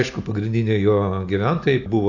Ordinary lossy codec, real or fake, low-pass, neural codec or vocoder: AAC, 48 kbps; real; 7.2 kHz; none